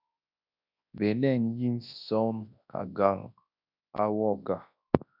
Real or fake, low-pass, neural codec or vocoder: fake; 5.4 kHz; codec, 24 kHz, 0.9 kbps, WavTokenizer, large speech release